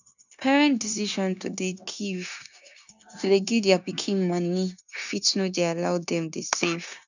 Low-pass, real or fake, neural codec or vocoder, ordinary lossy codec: 7.2 kHz; fake; codec, 16 kHz, 0.9 kbps, LongCat-Audio-Codec; none